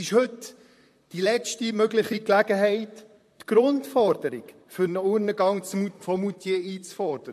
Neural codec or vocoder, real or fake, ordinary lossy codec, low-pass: vocoder, 44.1 kHz, 128 mel bands, Pupu-Vocoder; fake; MP3, 64 kbps; 14.4 kHz